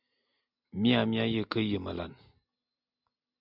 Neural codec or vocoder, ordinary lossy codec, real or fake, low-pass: none; MP3, 48 kbps; real; 5.4 kHz